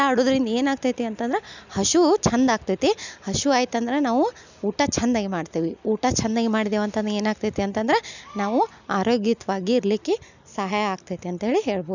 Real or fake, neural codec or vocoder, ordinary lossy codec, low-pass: real; none; none; 7.2 kHz